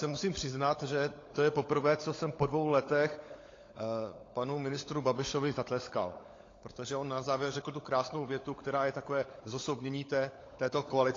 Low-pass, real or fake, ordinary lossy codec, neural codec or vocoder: 7.2 kHz; fake; AAC, 32 kbps; codec, 16 kHz, 16 kbps, FunCodec, trained on LibriTTS, 50 frames a second